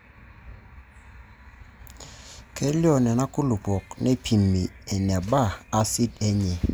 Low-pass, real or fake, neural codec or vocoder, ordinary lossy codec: none; real; none; none